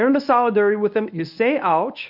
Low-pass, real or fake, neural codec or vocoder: 5.4 kHz; fake; codec, 24 kHz, 0.9 kbps, WavTokenizer, medium speech release version 1